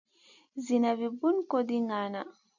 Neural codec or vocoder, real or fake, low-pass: none; real; 7.2 kHz